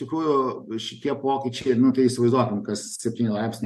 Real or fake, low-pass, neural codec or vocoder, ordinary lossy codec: real; 14.4 kHz; none; MP3, 64 kbps